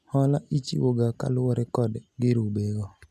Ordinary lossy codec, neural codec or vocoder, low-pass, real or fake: none; none; 14.4 kHz; real